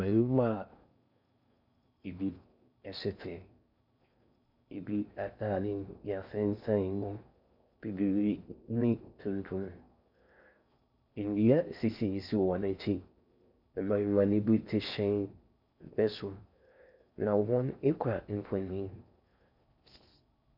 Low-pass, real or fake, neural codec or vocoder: 5.4 kHz; fake; codec, 16 kHz in and 24 kHz out, 0.6 kbps, FocalCodec, streaming, 4096 codes